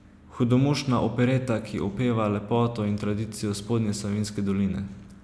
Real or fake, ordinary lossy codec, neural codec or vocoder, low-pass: real; none; none; none